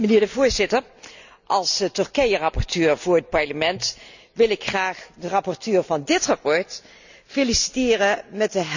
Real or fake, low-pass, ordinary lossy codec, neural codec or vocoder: real; 7.2 kHz; none; none